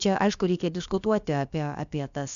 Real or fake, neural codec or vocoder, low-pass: fake; codec, 16 kHz, about 1 kbps, DyCAST, with the encoder's durations; 7.2 kHz